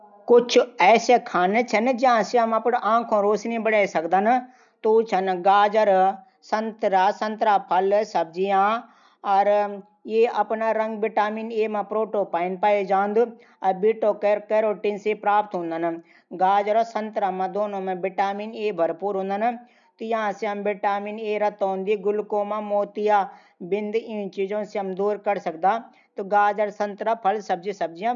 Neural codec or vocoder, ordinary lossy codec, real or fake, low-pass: none; none; real; 7.2 kHz